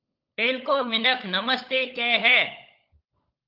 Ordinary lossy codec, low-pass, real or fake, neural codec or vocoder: Opus, 24 kbps; 5.4 kHz; fake; codec, 16 kHz, 16 kbps, FunCodec, trained on LibriTTS, 50 frames a second